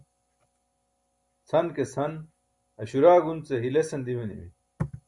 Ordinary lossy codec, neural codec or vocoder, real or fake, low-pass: Opus, 64 kbps; none; real; 10.8 kHz